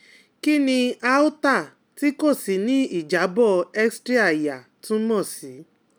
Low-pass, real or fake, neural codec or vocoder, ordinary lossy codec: none; real; none; none